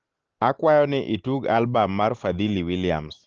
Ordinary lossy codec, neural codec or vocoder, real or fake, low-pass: Opus, 16 kbps; none; real; 7.2 kHz